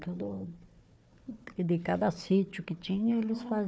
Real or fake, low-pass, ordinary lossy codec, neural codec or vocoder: fake; none; none; codec, 16 kHz, 4 kbps, FunCodec, trained on Chinese and English, 50 frames a second